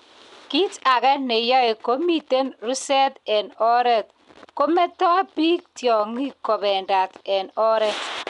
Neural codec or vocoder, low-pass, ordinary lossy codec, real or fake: none; 10.8 kHz; none; real